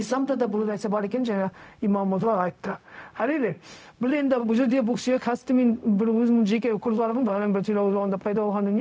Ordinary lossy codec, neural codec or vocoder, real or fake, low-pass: none; codec, 16 kHz, 0.4 kbps, LongCat-Audio-Codec; fake; none